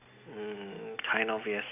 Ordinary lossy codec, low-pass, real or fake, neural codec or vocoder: none; 3.6 kHz; real; none